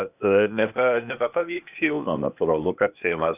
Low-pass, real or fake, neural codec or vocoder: 3.6 kHz; fake; codec, 16 kHz, 0.8 kbps, ZipCodec